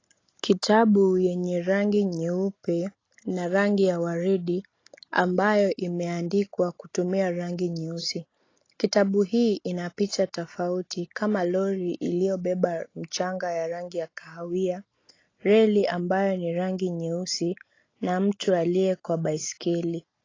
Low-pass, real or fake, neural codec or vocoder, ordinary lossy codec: 7.2 kHz; real; none; AAC, 32 kbps